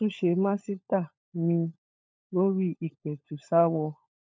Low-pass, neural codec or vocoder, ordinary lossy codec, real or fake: none; codec, 16 kHz, 16 kbps, FunCodec, trained on LibriTTS, 50 frames a second; none; fake